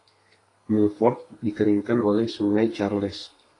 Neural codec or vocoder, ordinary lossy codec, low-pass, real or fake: codec, 32 kHz, 1.9 kbps, SNAC; AAC, 32 kbps; 10.8 kHz; fake